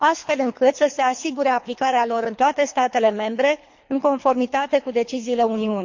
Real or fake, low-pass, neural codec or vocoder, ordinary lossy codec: fake; 7.2 kHz; codec, 24 kHz, 3 kbps, HILCodec; MP3, 48 kbps